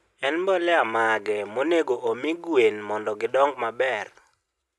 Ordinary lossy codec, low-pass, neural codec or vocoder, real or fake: none; none; none; real